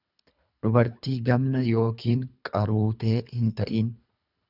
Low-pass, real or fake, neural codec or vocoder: 5.4 kHz; fake; codec, 24 kHz, 3 kbps, HILCodec